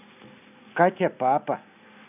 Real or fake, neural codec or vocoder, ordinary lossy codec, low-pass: real; none; none; 3.6 kHz